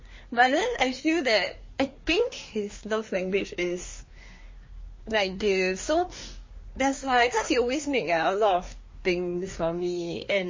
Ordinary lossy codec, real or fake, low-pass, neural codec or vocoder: MP3, 32 kbps; fake; 7.2 kHz; codec, 24 kHz, 1 kbps, SNAC